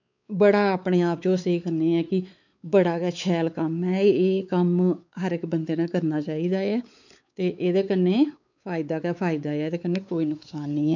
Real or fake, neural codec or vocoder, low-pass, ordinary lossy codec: fake; codec, 16 kHz, 4 kbps, X-Codec, WavLM features, trained on Multilingual LibriSpeech; 7.2 kHz; none